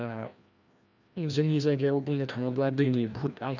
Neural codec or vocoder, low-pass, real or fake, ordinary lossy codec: codec, 16 kHz, 1 kbps, FreqCodec, larger model; 7.2 kHz; fake; none